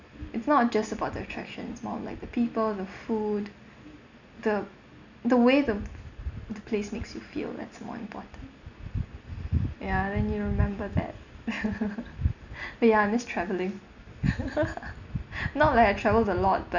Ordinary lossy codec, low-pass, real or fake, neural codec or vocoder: none; 7.2 kHz; real; none